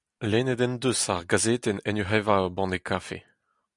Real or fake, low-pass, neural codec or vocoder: real; 10.8 kHz; none